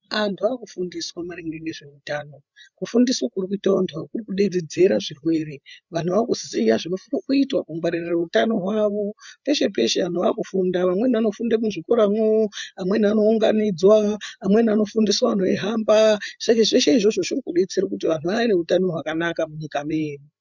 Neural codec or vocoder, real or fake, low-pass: codec, 16 kHz, 8 kbps, FreqCodec, larger model; fake; 7.2 kHz